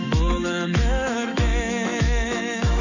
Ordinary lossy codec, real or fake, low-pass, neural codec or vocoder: none; fake; 7.2 kHz; autoencoder, 48 kHz, 128 numbers a frame, DAC-VAE, trained on Japanese speech